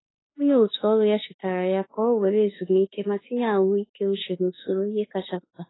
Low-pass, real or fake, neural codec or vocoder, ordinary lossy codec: 7.2 kHz; fake; autoencoder, 48 kHz, 32 numbers a frame, DAC-VAE, trained on Japanese speech; AAC, 16 kbps